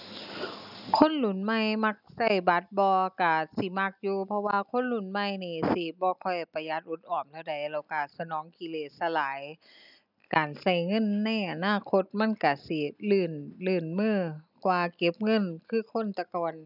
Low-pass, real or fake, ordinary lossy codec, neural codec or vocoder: 5.4 kHz; real; none; none